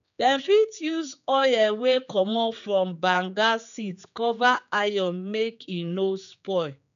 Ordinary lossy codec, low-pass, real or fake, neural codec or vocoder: none; 7.2 kHz; fake; codec, 16 kHz, 4 kbps, X-Codec, HuBERT features, trained on general audio